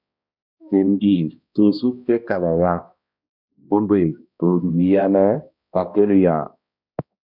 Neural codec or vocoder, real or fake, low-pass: codec, 16 kHz, 1 kbps, X-Codec, HuBERT features, trained on balanced general audio; fake; 5.4 kHz